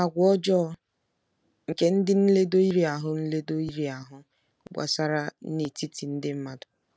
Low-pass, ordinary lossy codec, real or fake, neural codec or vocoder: none; none; real; none